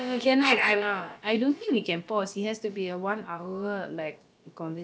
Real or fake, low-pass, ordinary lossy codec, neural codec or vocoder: fake; none; none; codec, 16 kHz, about 1 kbps, DyCAST, with the encoder's durations